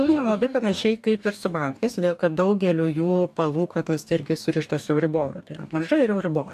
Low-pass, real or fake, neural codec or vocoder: 14.4 kHz; fake; codec, 44.1 kHz, 2.6 kbps, DAC